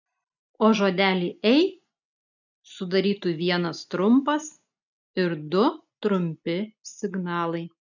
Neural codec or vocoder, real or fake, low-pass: none; real; 7.2 kHz